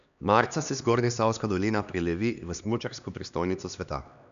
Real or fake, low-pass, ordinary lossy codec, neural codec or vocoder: fake; 7.2 kHz; none; codec, 16 kHz, 2 kbps, X-Codec, HuBERT features, trained on LibriSpeech